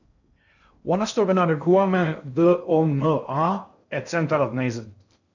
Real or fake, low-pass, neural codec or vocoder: fake; 7.2 kHz; codec, 16 kHz in and 24 kHz out, 0.6 kbps, FocalCodec, streaming, 4096 codes